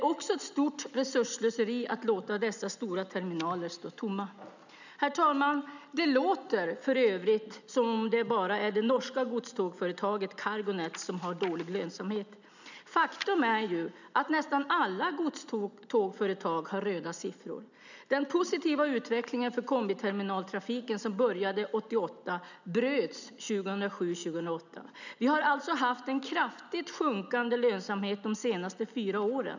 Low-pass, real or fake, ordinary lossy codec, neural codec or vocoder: 7.2 kHz; real; none; none